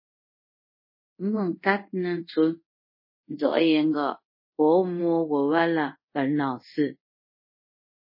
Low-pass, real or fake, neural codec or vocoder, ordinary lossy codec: 5.4 kHz; fake; codec, 24 kHz, 0.5 kbps, DualCodec; MP3, 24 kbps